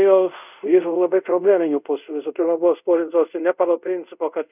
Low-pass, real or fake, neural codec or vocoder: 3.6 kHz; fake; codec, 24 kHz, 0.5 kbps, DualCodec